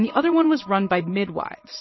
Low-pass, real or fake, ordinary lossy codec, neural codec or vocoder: 7.2 kHz; fake; MP3, 24 kbps; vocoder, 22.05 kHz, 80 mel bands, Vocos